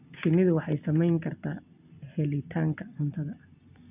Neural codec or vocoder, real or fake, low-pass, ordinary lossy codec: none; real; 3.6 kHz; none